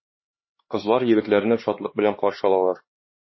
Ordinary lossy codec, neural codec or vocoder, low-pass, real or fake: MP3, 24 kbps; codec, 16 kHz, 2 kbps, X-Codec, HuBERT features, trained on LibriSpeech; 7.2 kHz; fake